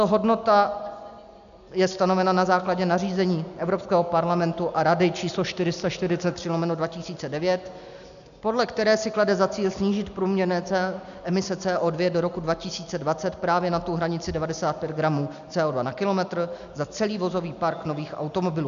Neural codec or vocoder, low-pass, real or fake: none; 7.2 kHz; real